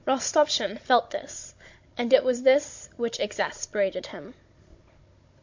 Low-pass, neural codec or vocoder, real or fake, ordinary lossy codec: 7.2 kHz; codec, 16 kHz, 16 kbps, FunCodec, trained on Chinese and English, 50 frames a second; fake; MP3, 48 kbps